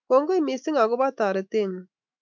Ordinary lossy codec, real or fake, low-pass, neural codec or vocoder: MP3, 64 kbps; fake; 7.2 kHz; autoencoder, 48 kHz, 128 numbers a frame, DAC-VAE, trained on Japanese speech